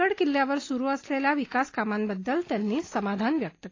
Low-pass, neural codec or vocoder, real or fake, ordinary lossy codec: 7.2 kHz; none; real; AAC, 32 kbps